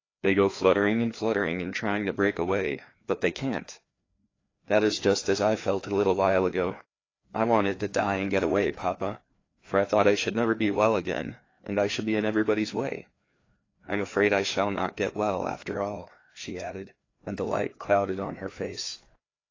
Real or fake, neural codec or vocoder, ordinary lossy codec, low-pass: fake; codec, 16 kHz, 2 kbps, FreqCodec, larger model; AAC, 32 kbps; 7.2 kHz